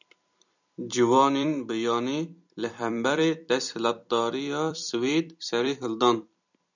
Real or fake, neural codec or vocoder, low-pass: fake; vocoder, 44.1 kHz, 128 mel bands every 256 samples, BigVGAN v2; 7.2 kHz